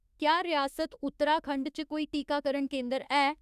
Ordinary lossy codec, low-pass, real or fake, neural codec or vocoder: none; 14.4 kHz; fake; autoencoder, 48 kHz, 32 numbers a frame, DAC-VAE, trained on Japanese speech